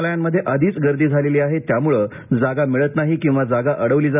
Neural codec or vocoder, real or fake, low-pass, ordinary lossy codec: none; real; 3.6 kHz; none